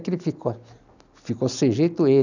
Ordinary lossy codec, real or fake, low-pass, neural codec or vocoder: none; real; 7.2 kHz; none